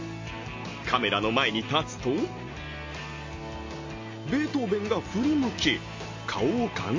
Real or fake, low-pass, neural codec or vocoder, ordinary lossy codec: real; 7.2 kHz; none; MP3, 32 kbps